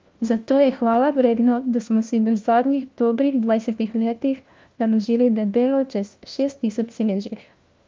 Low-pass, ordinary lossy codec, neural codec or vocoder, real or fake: 7.2 kHz; Opus, 32 kbps; codec, 16 kHz, 1 kbps, FunCodec, trained on LibriTTS, 50 frames a second; fake